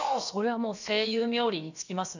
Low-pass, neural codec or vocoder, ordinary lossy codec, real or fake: 7.2 kHz; codec, 16 kHz, about 1 kbps, DyCAST, with the encoder's durations; none; fake